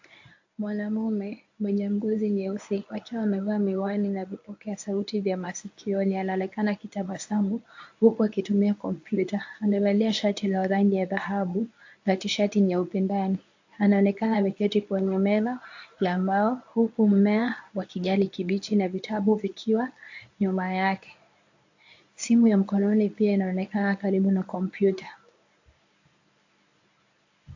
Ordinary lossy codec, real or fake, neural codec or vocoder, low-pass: AAC, 48 kbps; fake; codec, 24 kHz, 0.9 kbps, WavTokenizer, medium speech release version 1; 7.2 kHz